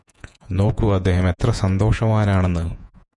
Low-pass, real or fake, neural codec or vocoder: 10.8 kHz; fake; vocoder, 48 kHz, 128 mel bands, Vocos